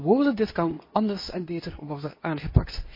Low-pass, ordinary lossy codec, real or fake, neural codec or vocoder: 5.4 kHz; MP3, 24 kbps; fake; codec, 24 kHz, 0.9 kbps, WavTokenizer, medium speech release version 2